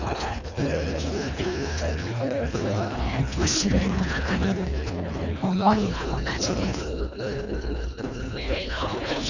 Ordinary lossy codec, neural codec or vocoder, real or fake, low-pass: none; codec, 24 kHz, 1.5 kbps, HILCodec; fake; 7.2 kHz